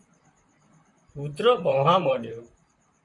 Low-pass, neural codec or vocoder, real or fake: 10.8 kHz; vocoder, 44.1 kHz, 128 mel bands, Pupu-Vocoder; fake